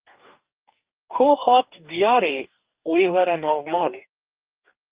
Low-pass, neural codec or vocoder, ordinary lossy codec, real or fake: 3.6 kHz; codec, 44.1 kHz, 2.6 kbps, DAC; Opus, 24 kbps; fake